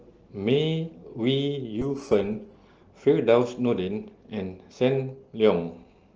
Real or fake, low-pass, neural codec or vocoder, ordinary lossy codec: real; 7.2 kHz; none; Opus, 16 kbps